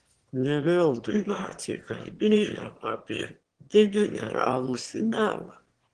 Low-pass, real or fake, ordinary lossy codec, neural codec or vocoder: 9.9 kHz; fake; Opus, 16 kbps; autoencoder, 22.05 kHz, a latent of 192 numbers a frame, VITS, trained on one speaker